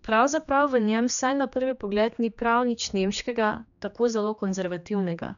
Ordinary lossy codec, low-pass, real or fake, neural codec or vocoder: none; 7.2 kHz; fake; codec, 16 kHz, 2 kbps, X-Codec, HuBERT features, trained on general audio